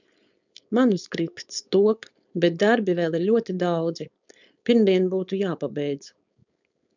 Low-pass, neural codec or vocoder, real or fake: 7.2 kHz; codec, 16 kHz, 4.8 kbps, FACodec; fake